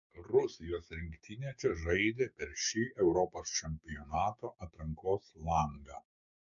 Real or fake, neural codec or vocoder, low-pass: fake; codec, 16 kHz, 6 kbps, DAC; 7.2 kHz